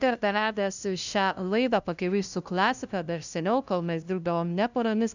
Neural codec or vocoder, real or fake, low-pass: codec, 16 kHz, 0.5 kbps, FunCodec, trained on LibriTTS, 25 frames a second; fake; 7.2 kHz